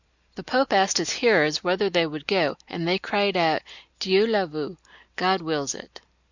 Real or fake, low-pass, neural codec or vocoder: real; 7.2 kHz; none